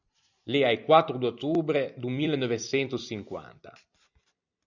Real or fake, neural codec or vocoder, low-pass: fake; vocoder, 44.1 kHz, 128 mel bands every 256 samples, BigVGAN v2; 7.2 kHz